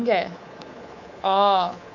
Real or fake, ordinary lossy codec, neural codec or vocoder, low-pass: fake; none; codec, 24 kHz, 3.1 kbps, DualCodec; 7.2 kHz